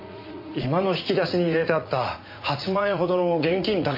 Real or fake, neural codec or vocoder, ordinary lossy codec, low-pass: fake; vocoder, 44.1 kHz, 80 mel bands, Vocos; MP3, 32 kbps; 5.4 kHz